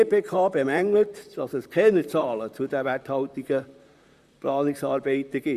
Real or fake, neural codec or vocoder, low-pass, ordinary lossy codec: fake; vocoder, 44.1 kHz, 128 mel bands, Pupu-Vocoder; 14.4 kHz; Opus, 64 kbps